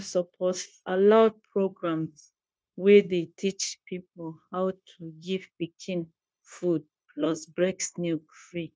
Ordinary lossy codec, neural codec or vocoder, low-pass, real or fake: none; codec, 16 kHz, 0.9 kbps, LongCat-Audio-Codec; none; fake